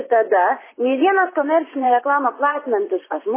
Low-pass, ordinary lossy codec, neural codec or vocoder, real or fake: 3.6 kHz; MP3, 16 kbps; vocoder, 44.1 kHz, 128 mel bands, Pupu-Vocoder; fake